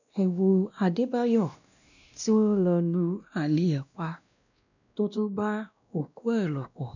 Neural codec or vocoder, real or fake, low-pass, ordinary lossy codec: codec, 16 kHz, 1 kbps, X-Codec, WavLM features, trained on Multilingual LibriSpeech; fake; 7.2 kHz; none